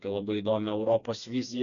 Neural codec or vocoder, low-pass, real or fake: codec, 16 kHz, 2 kbps, FreqCodec, smaller model; 7.2 kHz; fake